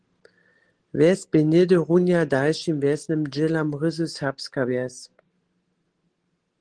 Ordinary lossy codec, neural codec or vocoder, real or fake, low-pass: Opus, 16 kbps; none; real; 9.9 kHz